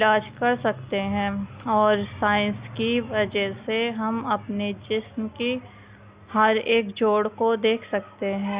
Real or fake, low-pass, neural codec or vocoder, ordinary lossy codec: real; 3.6 kHz; none; Opus, 64 kbps